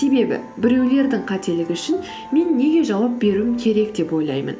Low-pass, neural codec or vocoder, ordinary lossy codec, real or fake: none; none; none; real